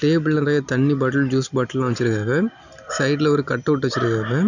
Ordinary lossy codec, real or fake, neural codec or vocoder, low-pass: none; real; none; 7.2 kHz